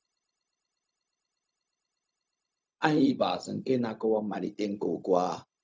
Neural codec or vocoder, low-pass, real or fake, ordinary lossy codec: codec, 16 kHz, 0.4 kbps, LongCat-Audio-Codec; none; fake; none